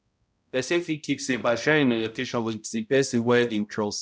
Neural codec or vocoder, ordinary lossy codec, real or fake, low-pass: codec, 16 kHz, 0.5 kbps, X-Codec, HuBERT features, trained on balanced general audio; none; fake; none